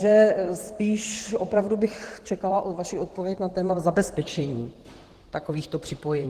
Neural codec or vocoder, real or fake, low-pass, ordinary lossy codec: vocoder, 44.1 kHz, 128 mel bands, Pupu-Vocoder; fake; 14.4 kHz; Opus, 16 kbps